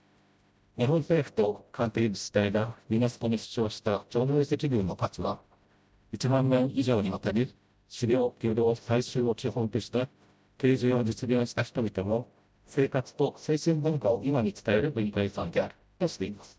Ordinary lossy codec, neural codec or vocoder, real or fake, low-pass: none; codec, 16 kHz, 0.5 kbps, FreqCodec, smaller model; fake; none